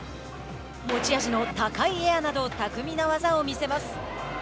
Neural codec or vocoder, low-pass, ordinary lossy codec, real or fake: none; none; none; real